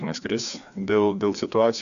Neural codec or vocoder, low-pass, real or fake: codec, 16 kHz, 2 kbps, FreqCodec, larger model; 7.2 kHz; fake